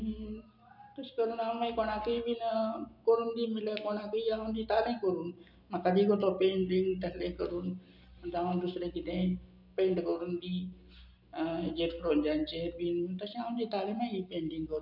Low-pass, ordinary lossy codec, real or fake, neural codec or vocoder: 5.4 kHz; none; real; none